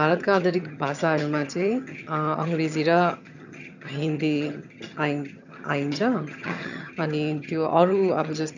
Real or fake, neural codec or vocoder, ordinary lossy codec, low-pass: fake; vocoder, 22.05 kHz, 80 mel bands, HiFi-GAN; none; 7.2 kHz